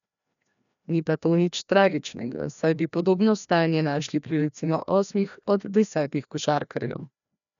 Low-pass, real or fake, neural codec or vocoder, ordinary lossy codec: 7.2 kHz; fake; codec, 16 kHz, 1 kbps, FreqCodec, larger model; none